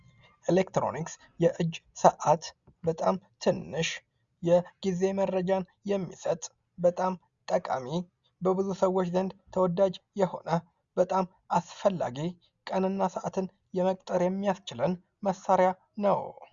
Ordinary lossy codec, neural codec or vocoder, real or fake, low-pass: Opus, 64 kbps; none; real; 7.2 kHz